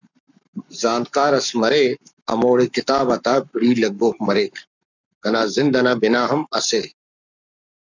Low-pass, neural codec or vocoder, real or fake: 7.2 kHz; codec, 44.1 kHz, 7.8 kbps, Pupu-Codec; fake